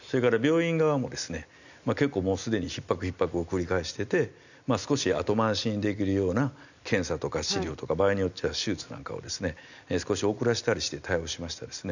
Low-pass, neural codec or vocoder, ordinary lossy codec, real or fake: 7.2 kHz; none; none; real